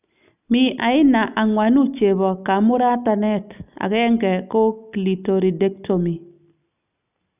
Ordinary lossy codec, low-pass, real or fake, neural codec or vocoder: none; 3.6 kHz; real; none